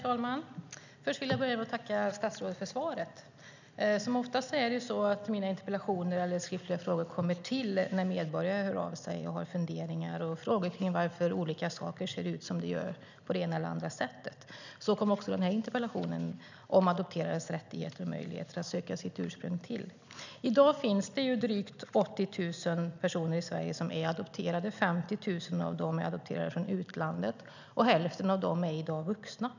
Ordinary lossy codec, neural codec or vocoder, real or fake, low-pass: none; none; real; 7.2 kHz